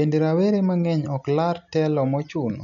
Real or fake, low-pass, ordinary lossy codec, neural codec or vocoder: real; 7.2 kHz; none; none